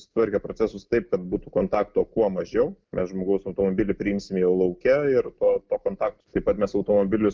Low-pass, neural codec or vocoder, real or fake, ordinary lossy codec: 7.2 kHz; none; real; Opus, 24 kbps